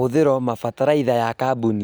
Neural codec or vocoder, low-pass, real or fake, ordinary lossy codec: none; none; real; none